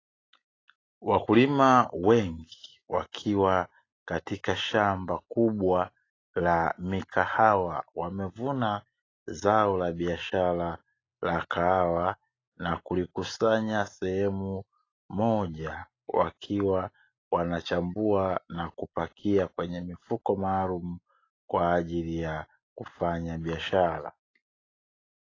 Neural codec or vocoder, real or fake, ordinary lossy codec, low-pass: none; real; AAC, 32 kbps; 7.2 kHz